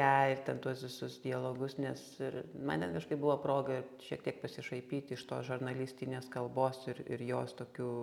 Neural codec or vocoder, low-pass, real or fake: none; 19.8 kHz; real